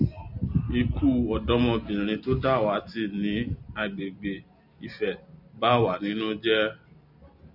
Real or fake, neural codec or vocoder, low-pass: real; none; 5.4 kHz